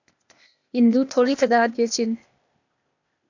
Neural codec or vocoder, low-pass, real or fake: codec, 16 kHz, 0.8 kbps, ZipCodec; 7.2 kHz; fake